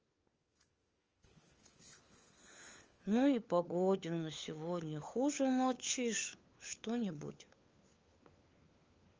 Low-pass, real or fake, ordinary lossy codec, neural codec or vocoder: 7.2 kHz; fake; Opus, 24 kbps; codec, 16 kHz, 4 kbps, FunCodec, trained on LibriTTS, 50 frames a second